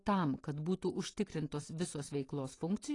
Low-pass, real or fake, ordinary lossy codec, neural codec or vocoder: 10.8 kHz; real; AAC, 32 kbps; none